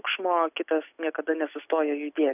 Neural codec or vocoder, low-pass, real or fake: none; 3.6 kHz; real